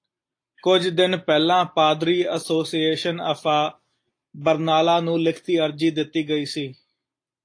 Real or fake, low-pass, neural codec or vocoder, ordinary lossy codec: real; 9.9 kHz; none; AAC, 48 kbps